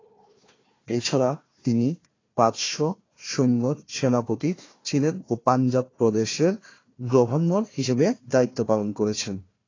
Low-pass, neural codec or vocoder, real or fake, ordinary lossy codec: 7.2 kHz; codec, 16 kHz, 1 kbps, FunCodec, trained on Chinese and English, 50 frames a second; fake; AAC, 32 kbps